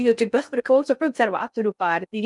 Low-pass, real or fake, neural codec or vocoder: 10.8 kHz; fake; codec, 16 kHz in and 24 kHz out, 0.6 kbps, FocalCodec, streaming, 2048 codes